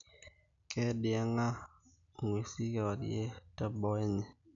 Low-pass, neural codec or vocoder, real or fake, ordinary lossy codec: 7.2 kHz; none; real; none